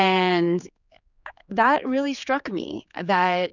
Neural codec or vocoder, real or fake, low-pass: codec, 16 kHz, 4 kbps, X-Codec, HuBERT features, trained on general audio; fake; 7.2 kHz